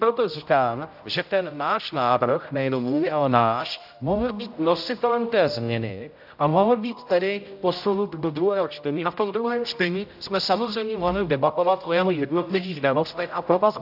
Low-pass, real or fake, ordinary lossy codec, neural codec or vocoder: 5.4 kHz; fake; AAC, 48 kbps; codec, 16 kHz, 0.5 kbps, X-Codec, HuBERT features, trained on general audio